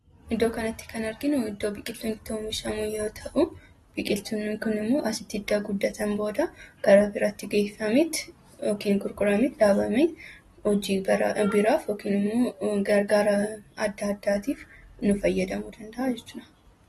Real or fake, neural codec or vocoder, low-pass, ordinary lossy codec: real; none; 19.8 kHz; AAC, 32 kbps